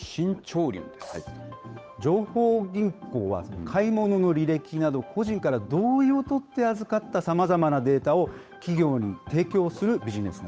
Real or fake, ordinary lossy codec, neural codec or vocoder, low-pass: fake; none; codec, 16 kHz, 8 kbps, FunCodec, trained on Chinese and English, 25 frames a second; none